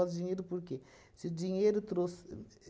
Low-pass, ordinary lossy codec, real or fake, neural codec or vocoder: none; none; real; none